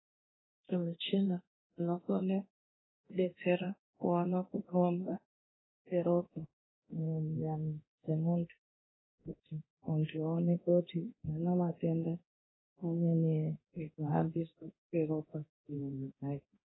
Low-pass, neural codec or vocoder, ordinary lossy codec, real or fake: 7.2 kHz; codec, 24 kHz, 0.9 kbps, DualCodec; AAC, 16 kbps; fake